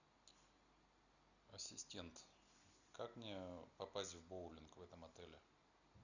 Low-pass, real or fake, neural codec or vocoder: 7.2 kHz; real; none